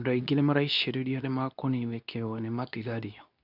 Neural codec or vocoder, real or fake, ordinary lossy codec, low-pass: codec, 24 kHz, 0.9 kbps, WavTokenizer, medium speech release version 2; fake; none; 5.4 kHz